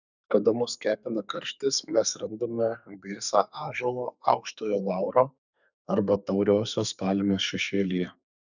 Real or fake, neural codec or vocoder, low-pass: fake; codec, 32 kHz, 1.9 kbps, SNAC; 7.2 kHz